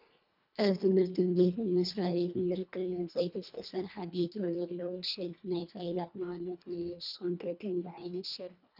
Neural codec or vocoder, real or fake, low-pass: codec, 24 kHz, 1.5 kbps, HILCodec; fake; 5.4 kHz